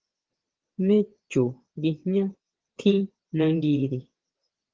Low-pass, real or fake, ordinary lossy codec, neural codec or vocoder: 7.2 kHz; fake; Opus, 16 kbps; vocoder, 22.05 kHz, 80 mel bands, WaveNeXt